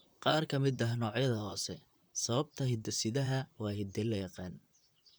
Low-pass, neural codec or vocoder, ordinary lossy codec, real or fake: none; vocoder, 44.1 kHz, 128 mel bands, Pupu-Vocoder; none; fake